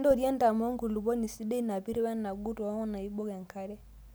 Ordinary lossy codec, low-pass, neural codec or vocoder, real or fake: none; none; none; real